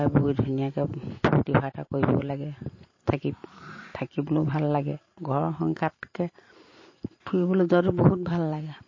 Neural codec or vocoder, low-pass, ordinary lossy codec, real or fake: vocoder, 44.1 kHz, 128 mel bands every 512 samples, BigVGAN v2; 7.2 kHz; MP3, 32 kbps; fake